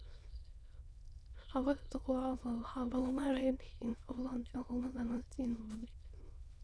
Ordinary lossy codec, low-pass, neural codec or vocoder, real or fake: none; none; autoencoder, 22.05 kHz, a latent of 192 numbers a frame, VITS, trained on many speakers; fake